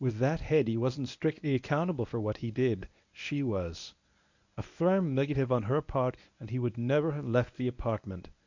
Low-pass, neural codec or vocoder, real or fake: 7.2 kHz; codec, 24 kHz, 0.9 kbps, WavTokenizer, medium speech release version 1; fake